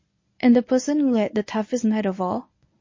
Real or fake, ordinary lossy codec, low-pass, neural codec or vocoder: fake; MP3, 32 kbps; 7.2 kHz; codec, 24 kHz, 0.9 kbps, WavTokenizer, medium speech release version 1